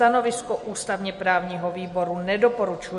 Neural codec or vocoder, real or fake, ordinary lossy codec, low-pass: none; real; MP3, 48 kbps; 14.4 kHz